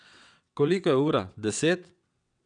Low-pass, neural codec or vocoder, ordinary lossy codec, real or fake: 9.9 kHz; vocoder, 22.05 kHz, 80 mel bands, WaveNeXt; none; fake